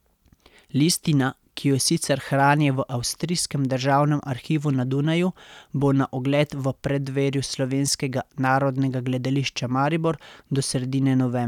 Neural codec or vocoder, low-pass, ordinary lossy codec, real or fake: none; 19.8 kHz; none; real